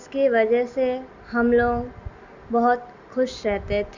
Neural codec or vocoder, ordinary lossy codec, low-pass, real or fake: none; Opus, 64 kbps; 7.2 kHz; real